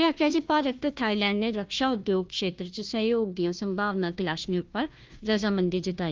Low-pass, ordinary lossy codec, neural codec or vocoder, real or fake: 7.2 kHz; Opus, 32 kbps; codec, 16 kHz, 1 kbps, FunCodec, trained on Chinese and English, 50 frames a second; fake